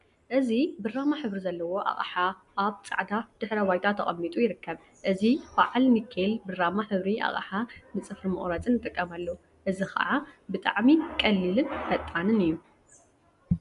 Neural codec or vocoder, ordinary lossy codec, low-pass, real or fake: none; MP3, 96 kbps; 10.8 kHz; real